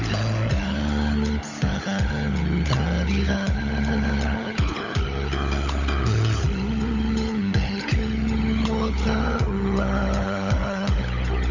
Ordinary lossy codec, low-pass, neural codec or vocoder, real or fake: Opus, 64 kbps; 7.2 kHz; codec, 16 kHz, 16 kbps, FunCodec, trained on LibriTTS, 50 frames a second; fake